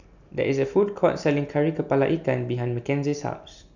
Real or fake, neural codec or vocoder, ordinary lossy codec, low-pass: real; none; none; 7.2 kHz